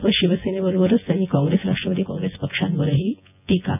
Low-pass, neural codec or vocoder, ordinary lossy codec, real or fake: 3.6 kHz; vocoder, 24 kHz, 100 mel bands, Vocos; none; fake